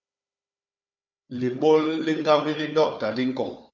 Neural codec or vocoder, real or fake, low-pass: codec, 16 kHz, 4 kbps, FunCodec, trained on Chinese and English, 50 frames a second; fake; 7.2 kHz